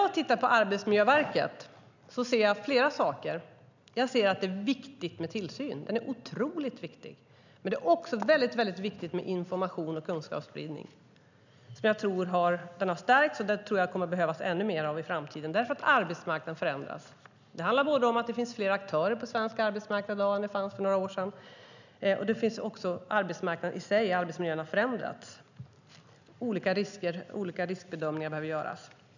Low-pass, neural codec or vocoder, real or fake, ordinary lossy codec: 7.2 kHz; none; real; none